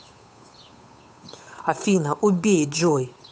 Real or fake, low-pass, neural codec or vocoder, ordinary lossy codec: fake; none; codec, 16 kHz, 8 kbps, FunCodec, trained on Chinese and English, 25 frames a second; none